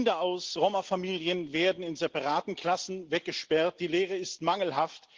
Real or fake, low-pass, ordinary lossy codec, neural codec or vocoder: real; 7.2 kHz; Opus, 16 kbps; none